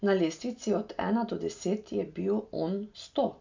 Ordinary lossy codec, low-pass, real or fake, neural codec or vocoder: MP3, 64 kbps; 7.2 kHz; real; none